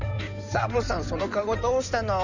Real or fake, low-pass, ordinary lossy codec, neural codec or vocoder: fake; 7.2 kHz; none; codec, 16 kHz in and 24 kHz out, 2.2 kbps, FireRedTTS-2 codec